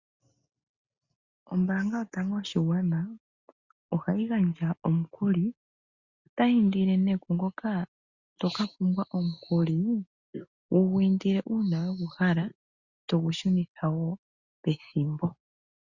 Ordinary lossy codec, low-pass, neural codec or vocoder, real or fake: Opus, 64 kbps; 7.2 kHz; none; real